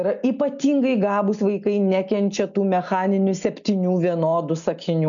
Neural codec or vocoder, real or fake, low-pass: none; real; 7.2 kHz